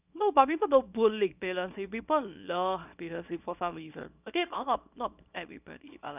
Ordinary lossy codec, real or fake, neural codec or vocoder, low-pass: none; fake; codec, 24 kHz, 0.9 kbps, WavTokenizer, small release; 3.6 kHz